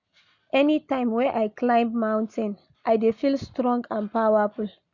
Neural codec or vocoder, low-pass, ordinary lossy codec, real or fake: none; 7.2 kHz; none; real